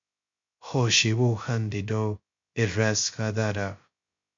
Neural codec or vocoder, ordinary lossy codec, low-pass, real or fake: codec, 16 kHz, 0.2 kbps, FocalCodec; MP3, 48 kbps; 7.2 kHz; fake